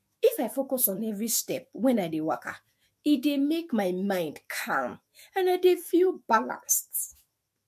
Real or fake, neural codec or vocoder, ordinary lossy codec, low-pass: fake; autoencoder, 48 kHz, 128 numbers a frame, DAC-VAE, trained on Japanese speech; MP3, 64 kbps; 14.4 kHz